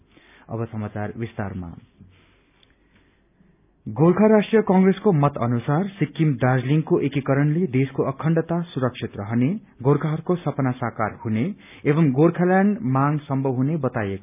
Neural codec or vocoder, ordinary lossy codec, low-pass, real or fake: none; none; 3.6 kHz; real